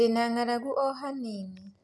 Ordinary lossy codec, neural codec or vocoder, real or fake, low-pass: none; none; real; none